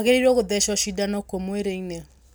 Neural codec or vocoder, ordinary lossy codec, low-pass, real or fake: none; none; none; real